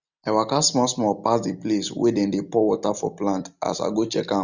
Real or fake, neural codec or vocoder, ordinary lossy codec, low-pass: real; none; none; 7.2 kHz